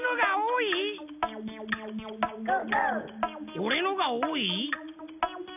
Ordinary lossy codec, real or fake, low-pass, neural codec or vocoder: none; real; 3.6 kHz; none